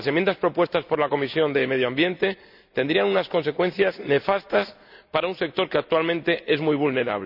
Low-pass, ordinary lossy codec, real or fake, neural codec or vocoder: 5.4 kHz; none; real; none